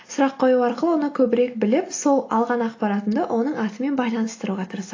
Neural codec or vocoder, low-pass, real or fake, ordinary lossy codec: none; 7.2 kHz; real; AAC, 32 kbps